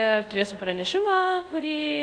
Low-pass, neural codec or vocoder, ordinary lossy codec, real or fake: 9.9 kHz; codec, 24 kHz, 0.5 kbps, DualCodec; AAC, 64 kbps; fake